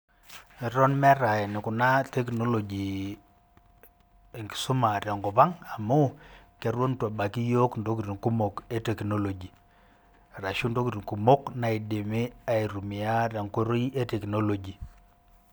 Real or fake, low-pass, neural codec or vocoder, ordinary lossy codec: real; none; none; none